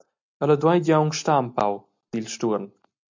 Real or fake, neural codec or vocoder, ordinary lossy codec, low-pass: real; none; MP3, 64 kbps; 7.2 kHz